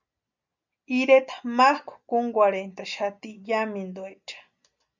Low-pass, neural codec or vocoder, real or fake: 7.2 kHz; none; real